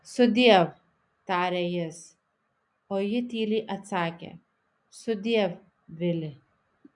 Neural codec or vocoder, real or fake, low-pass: none; real; 10.8 kHz